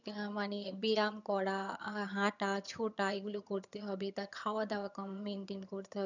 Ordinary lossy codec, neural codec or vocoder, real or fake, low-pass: none; vocoder, 22.05 kHz, 80 mel bands, HiFi-GAN; fake; 7.2 kHz